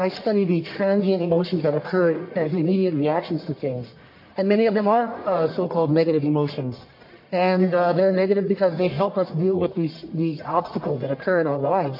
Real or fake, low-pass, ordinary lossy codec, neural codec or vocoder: fake; 5.4 kHz; MP3, 32 kbps; codec, 44.1 kHz, 1.7 kbps, Pupu-Codec